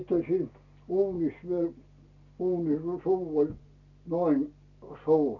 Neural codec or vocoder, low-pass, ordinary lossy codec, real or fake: none; 7.2 kHz; none; real